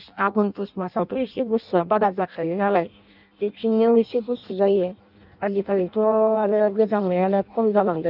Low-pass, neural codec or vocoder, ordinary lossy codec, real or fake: 5.4 kHz; codec, 16 kHz in and 24 kHz out, 0.6 kbps, FireRedTTS-2 codec; none; fake